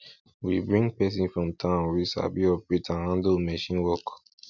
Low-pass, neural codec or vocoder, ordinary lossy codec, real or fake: 7.2 kHz; none; none; real